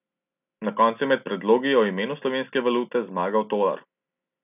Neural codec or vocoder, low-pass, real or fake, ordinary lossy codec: none; 3.6 kHz; real; none